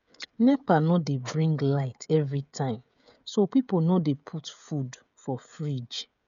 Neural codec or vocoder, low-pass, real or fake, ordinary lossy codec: codec, 16 kHz, 16 kbps, FreqCodec, smaller model; 7.2 kHz; fake; none